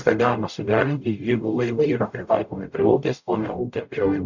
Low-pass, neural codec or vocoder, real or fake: 7.2 kHz; codec, 44.1 kHz, 0.9 kbps, DAC; fake